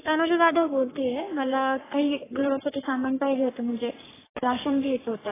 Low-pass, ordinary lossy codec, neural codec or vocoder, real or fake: 3.6 kHz; AAC, 16 kbps; codec, 44.1 kHz, 3.4 kbps, Pupu-Codec; fake